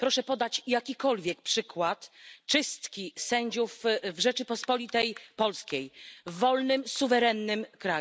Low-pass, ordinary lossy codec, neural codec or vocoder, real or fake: none; none; none; real